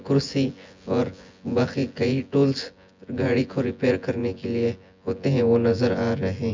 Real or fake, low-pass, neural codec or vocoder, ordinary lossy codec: fake; 7.2 kHz; vocoder, 24 kHz, 100 mel bands, Vocos; MP3, 64 kbps